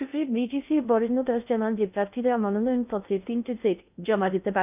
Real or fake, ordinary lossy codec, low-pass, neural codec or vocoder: fake; none; 3.6 kHz; codec, 16 kHz in and 24 kHz out, 0.6 kbps, FocalCodec, streaming, 2048 codes